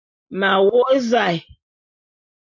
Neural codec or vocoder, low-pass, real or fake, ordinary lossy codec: none; 7.2 kHz; real; AAC, 48 kbps